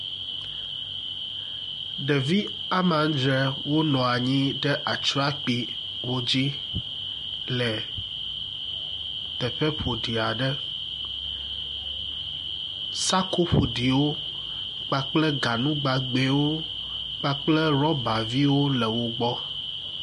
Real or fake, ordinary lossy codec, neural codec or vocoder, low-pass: real; MP3, 48 kbps; none; 10.8 kHz